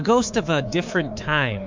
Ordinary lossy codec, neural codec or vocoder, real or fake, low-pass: MP3, 64 kbps; codec, 24 kHz, 3.1 kbps, DualCodec; fake; 7.2 kHz